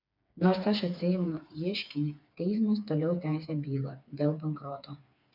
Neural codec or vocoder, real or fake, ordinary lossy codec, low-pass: codec, 16 kHz, 4 kbps, FreqCodec, smaller model; fake; MP3, 48 kbps; 5.4 kHz